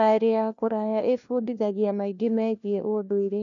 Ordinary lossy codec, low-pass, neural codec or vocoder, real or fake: none; 7.2 kHz; codec, 16 kHz, 1 kbps, FunCodec, trained on LibriTTS, 50 frames a second; fake